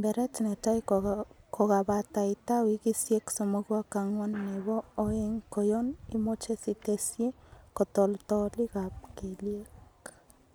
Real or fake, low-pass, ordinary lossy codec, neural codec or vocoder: real; none; none; none